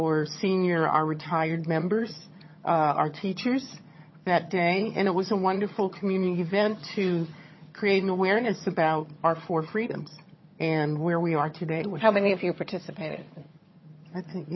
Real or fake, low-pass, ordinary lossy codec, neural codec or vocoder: fake; 7.2 kHz; MP3, 24 kbps; vocoder, 22.05 kHz, 80 mel bands, HiFi-GAN